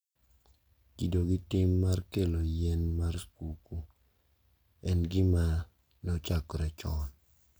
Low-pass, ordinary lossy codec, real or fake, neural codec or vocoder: none; none; real; none